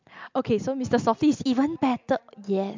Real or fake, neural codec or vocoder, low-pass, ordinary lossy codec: real; none; 7.2 kHz; MP3, 64 kbps